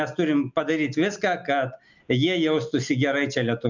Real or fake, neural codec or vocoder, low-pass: real; none; 7.2 kHz